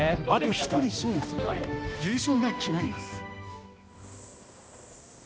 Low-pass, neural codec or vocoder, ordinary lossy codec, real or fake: none; codec, 16 kHz, 1 kbps, X-Codec, HuBERT features, trained on balanced general audio; none; fake